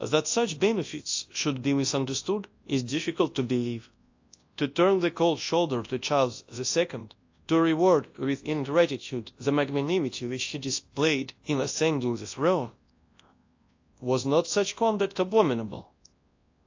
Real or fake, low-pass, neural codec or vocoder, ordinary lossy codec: fake; 7.2 kHz; codec, 24 kHz, 0.9 kbps, WavTokenizer, large speech release; AAC, 48 kbps